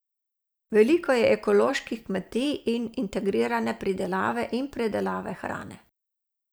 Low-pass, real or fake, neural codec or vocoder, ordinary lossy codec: none; fake; vocoder, 44.1 kHz, 128 mel bands every 512 samples, BigVGAN v2; none